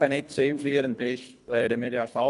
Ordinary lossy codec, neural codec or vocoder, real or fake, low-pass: none; codec, 24 kHz, 1.5 kbps, HILCodec; fake; 10.8 kHz